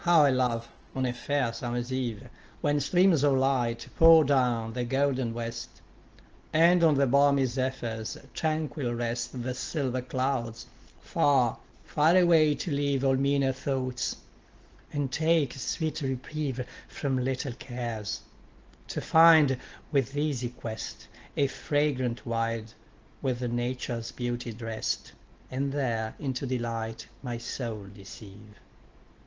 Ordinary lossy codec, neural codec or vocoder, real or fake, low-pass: Opus, 16 kbps; none; real; 7.2 kHz